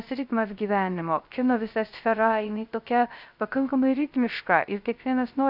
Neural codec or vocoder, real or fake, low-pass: codec, 16 kHz, 0.3 kbps, FocalCodec; fake; 5.4 kHz